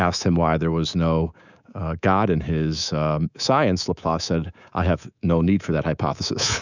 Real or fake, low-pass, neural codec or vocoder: fake; 7.2 kHz; codec, 24 kHz, 3.1 kbps, DualCodec